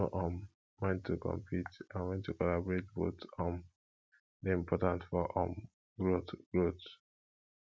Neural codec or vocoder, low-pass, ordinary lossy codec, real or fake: none; none; none; real